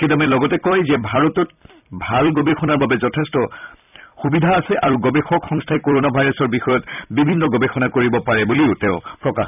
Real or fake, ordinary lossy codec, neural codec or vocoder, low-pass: fake; none; vocoder, 44.1 kHz, 128 mel bands every 256 samples, BigVGAN v2; 3.6 kHz